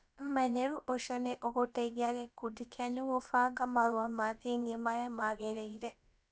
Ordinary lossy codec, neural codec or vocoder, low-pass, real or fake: none; codec, 16 kHz, about 1 kbps, DyCAST, with the encoder's durations; none; fake